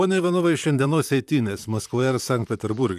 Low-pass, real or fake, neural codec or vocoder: 14.4 kHz; fake; vocoder, 44.1 kHz, 128 mel bands, Pupu-Vocoder